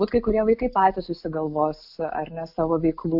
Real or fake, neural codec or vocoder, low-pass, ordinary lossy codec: real; none; 5.4 kHz; AAC, 48 kbps